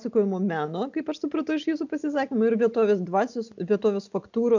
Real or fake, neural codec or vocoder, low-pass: real; none; 7.2 kHz